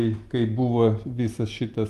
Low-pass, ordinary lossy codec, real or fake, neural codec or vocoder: 14.4 kHz; Opus, 32 kbps; real; none